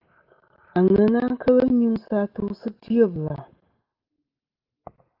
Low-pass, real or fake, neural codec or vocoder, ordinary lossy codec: 5.4 kHz; real; none; Opus, 32 kbps